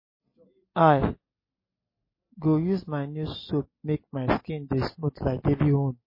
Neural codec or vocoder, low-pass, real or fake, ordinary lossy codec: none; 5.4 kHz; real; MP3, 24 kbps